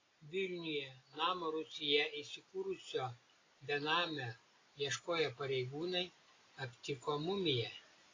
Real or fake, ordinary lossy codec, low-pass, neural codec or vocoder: real; AAC, 32 kbps; 7.2 kHz; none